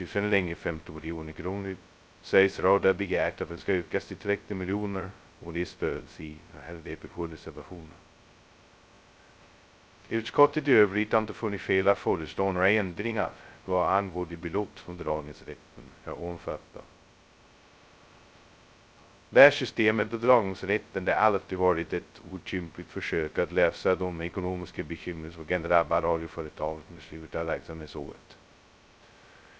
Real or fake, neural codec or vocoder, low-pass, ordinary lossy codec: fake; codec, 16 kHz, 0.2 kbps, FocalCodec; none; none